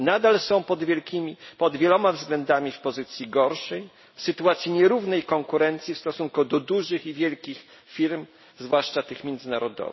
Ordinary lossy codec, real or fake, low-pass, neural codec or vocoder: MP3, 24 kbps; real; 7.2 kHz; none